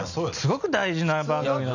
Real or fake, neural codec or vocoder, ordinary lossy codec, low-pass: real; none; none; 7.2 kHz